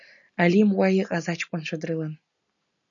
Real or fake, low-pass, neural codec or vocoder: real; 7.2 kHz; none